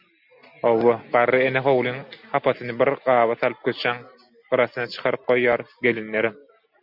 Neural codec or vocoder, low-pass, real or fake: none; 5.4 kHz; real